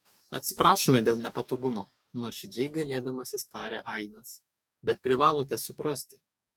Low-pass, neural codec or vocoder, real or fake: 19.8 kHz; codec, 44.1 kHz, 2.6 kbps, DAC; fake